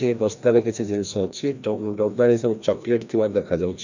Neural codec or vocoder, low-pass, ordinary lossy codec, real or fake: codec, 16 kHz, 1 kbps, FreqCodec, larger model; 7.2 kHz; none; fake